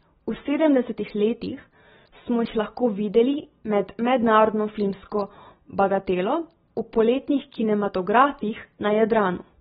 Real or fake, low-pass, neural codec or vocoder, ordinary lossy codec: real; 10.8 kHz; none; AAC, 16 kbps